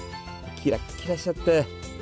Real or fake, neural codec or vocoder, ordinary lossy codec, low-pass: real; none; none; none